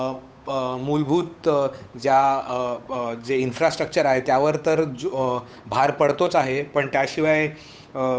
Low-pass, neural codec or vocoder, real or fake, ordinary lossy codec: none; codec, 16 kHz, 8 kbps, FunCodec, trained on Chinese and English, 25 frames a second; fake; none